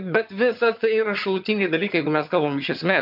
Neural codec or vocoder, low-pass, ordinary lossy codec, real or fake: vocoder, 22.05 kHz, 80 mel bands, HiFi-GAN; 5.4 kHz; MP3, 48 kbps; fake